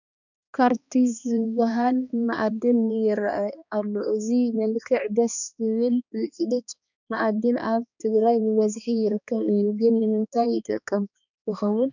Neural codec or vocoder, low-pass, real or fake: codec, 16 kHz, 2 kbps, X-Codec, HuBERT features, trained on balanced general audio; 7.2 kHz; fake